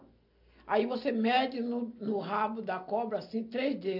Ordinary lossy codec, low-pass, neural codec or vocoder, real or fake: AAC, 48 kbps; 5.4 kHz; none; real